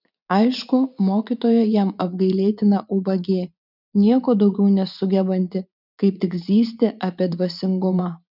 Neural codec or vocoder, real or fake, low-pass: vocoder, 44.1 kHz, 80 mel bands, Vocos; fake; 5.4 kHz